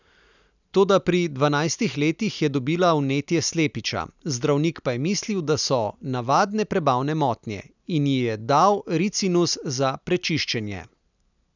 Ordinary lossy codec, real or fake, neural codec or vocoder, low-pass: none; real; none; 7.2 kHz